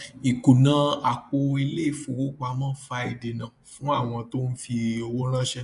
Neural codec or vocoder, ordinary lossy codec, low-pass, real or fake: vocoder, 24 kHz, 100 mel bands, Vocos; none; 10.8 kHz; fake